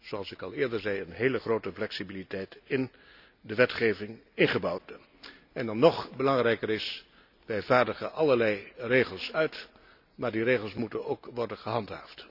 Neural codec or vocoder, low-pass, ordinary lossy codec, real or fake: none; 5.4 kHz; none; real